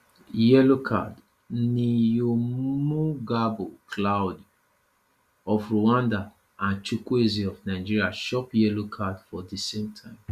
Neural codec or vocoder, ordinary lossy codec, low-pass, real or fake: none; Opus, 64 kbps; 14.4 kHz; real